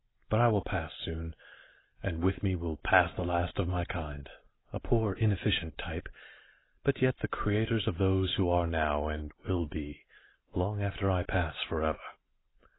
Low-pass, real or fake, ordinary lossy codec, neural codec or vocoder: 7.2 kHz; real; AAC, 16 kbps; none